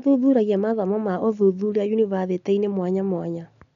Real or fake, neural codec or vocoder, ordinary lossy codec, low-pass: real; none; none; 7.2 kHz